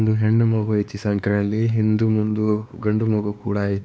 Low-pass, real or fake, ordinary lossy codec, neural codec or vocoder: none; fake; none; codec, 16 kHz, 2 kbps, X-Codec, WavLM features, trained on Multilingual LibriSpeech